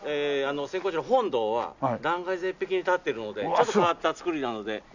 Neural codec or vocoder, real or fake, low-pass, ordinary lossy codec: none; real; 7.2 kHz; none